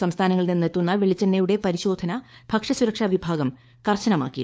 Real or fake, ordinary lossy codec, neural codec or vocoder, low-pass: fake; none; codec, 16 kHz, 4 kbps, FunCodec, trained on LibriTTS, 50 frames a second; none